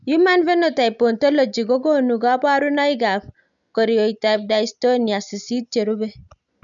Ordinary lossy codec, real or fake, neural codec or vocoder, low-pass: none; real; none; 7.2 kHz